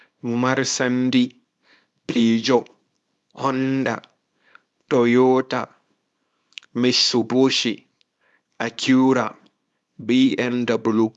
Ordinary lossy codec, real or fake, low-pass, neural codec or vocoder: none; fake; none; codec, 24 kHz, 0.9 kbps, WavTokenizer, small release